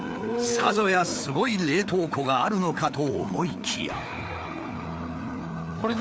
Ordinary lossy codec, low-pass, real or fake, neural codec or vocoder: none; none; fake; codec, 16 kHz, 4 kbps, FreqCodec, larger model